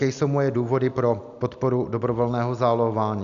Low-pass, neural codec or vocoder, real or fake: 7.2 kHz; none; real